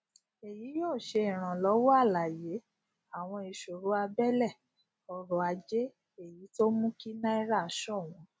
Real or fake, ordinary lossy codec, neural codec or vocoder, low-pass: real; none; none; none